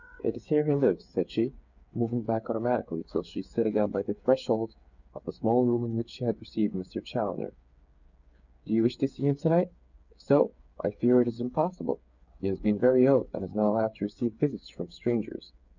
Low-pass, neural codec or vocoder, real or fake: 7.2 kHz; codec, 16 kHz, 8 kbps, FreqCodec, smaller model; fake